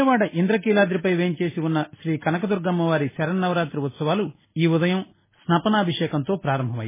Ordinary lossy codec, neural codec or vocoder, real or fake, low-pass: MP3, 16 kbps; none; real; 3.6 kHz